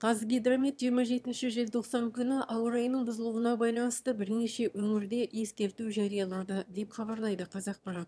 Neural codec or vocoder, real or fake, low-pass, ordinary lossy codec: autoencoder, 22.05 kHz, a latent of 192 numbers a frame, VITS, trained on one speaker; fake; none; none